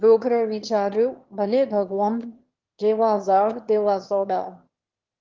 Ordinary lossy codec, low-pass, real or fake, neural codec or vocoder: Opus, 16 kbps; 7.2 kHz; fake; autoencoder, 22.05 kHz, a latent of 192 numbers a frame, VITS, trained on one speaker